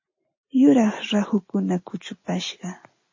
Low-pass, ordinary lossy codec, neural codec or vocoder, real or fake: 7.2 kHz; MP3, 32 kbps; none; real